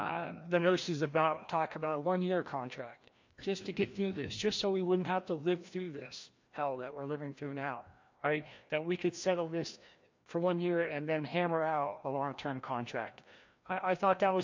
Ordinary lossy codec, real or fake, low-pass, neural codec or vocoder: MP3, 48 kbps; fake; 7.2 kHz; codec, 16 kHz, 1 kbps, FreqCodec, larger model